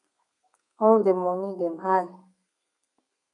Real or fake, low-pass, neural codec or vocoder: fake; 10.8 kHz; codec, 32 kHz, 1.9 kbps, SNAC